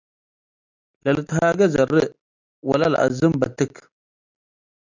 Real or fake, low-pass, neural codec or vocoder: real; 7.2 kHz; none